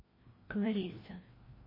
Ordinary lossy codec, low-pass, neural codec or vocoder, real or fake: MP3, 24 kbps; 5.4 kHz; codec, 16 kHz, 1 kbps, FreqCodec, larger model; fake